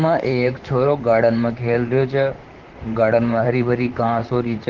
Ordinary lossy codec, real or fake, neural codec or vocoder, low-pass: Opus, 16 kbps; fake; vocoder, 44.1 kHz, 128 mel bands, Pupu-Vocoder; 7.2 kHz